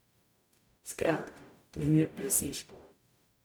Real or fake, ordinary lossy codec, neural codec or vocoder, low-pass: fake; none; codec, 44.1 kHz, 0.9 kbps, DAC; none